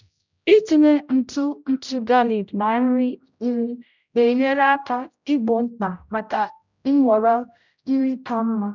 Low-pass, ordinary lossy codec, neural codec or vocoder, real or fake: 7.2 kHz; none; codec, 16 kHz, 0.5 kbps, X-Codec, HuBERT features, trained on general audio; fake